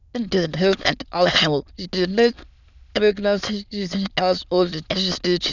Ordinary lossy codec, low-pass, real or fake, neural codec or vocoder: none; 7.2 kHz; fake; autoencoder, 22.05 kHz, a latent of 192 numbers a frame, VITS, trained on many speakers